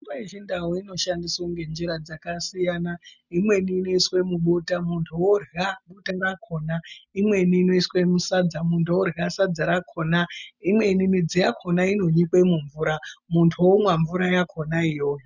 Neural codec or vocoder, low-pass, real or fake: none; 7.2 kHz; real